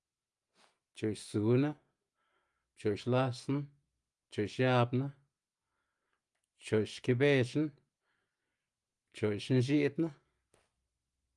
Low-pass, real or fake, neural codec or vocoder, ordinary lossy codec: 10.8 kHz; real; none; Opus, 32 kbps